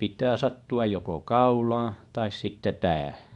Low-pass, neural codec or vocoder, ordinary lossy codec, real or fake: 10.8 kHz; codec, 24 kHz, 0.9 kbps, WavTokenizer, small release; MP3, 96 kbps; fake